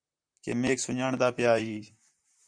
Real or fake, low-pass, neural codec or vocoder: fake; 9.9 kHz; vocoder, 44.1 kHz, 128 mel bands, Pupu-Vocoder